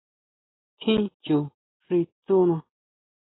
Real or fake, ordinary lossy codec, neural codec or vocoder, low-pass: real; AAC, 16 kbps; none; 7.2 kHz